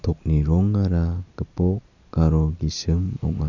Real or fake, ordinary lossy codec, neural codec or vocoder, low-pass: real; none; none; 7.2 kHz